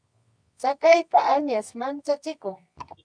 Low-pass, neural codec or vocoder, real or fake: 9.9 kHz; codec, 24 kHz, 0.9 kbps, WavTokenizer, medium music audio release; fake